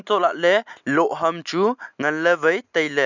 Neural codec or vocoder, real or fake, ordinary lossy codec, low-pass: none; real; none; 7.2 kHz